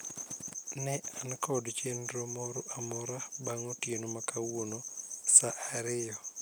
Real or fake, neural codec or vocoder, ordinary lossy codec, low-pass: real; none; none; none